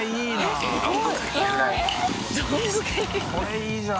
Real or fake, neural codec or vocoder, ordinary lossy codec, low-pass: real; none; none; none